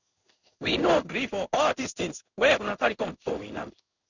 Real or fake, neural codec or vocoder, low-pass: fake; codec, 16 kHz in and 24 kHz out, 1 kbps, XY-Tokenizer; 7.2 kHz